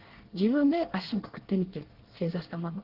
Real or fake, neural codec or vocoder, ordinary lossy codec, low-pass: fake; codec, 24 kHz, 1 kbps, SNAC; Opus, 16 kbps; 5.4 kHz